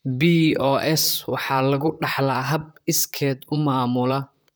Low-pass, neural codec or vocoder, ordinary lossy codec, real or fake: none; vocoder, 44.1 kHz, 128 mel bands, Pupu-Vocoder; none; fake